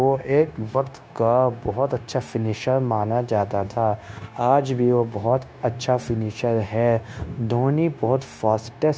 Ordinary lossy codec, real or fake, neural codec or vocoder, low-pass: none; fake; codec, 16 kHz, 0.9 kbps, LongCat-Audio-Codec; none